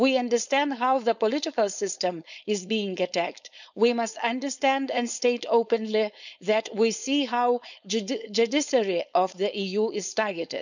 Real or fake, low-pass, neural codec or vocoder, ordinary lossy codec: fake; 7.2 kHz; codec, 16 kHz, 4.8 kbps, FACodec; none